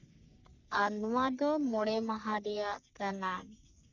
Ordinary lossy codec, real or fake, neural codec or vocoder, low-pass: none; fake; codec, 44.1 kHz, 3.4 kbps, Pupu-Codec; 7.2 kHz